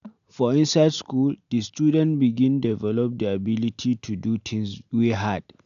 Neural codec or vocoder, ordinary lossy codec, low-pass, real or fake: none; none; 7.2 kHz; real